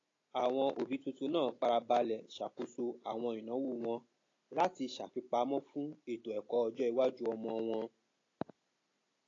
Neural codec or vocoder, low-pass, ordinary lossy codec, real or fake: none; 7.2 kHz; AAC, 32 kbps; real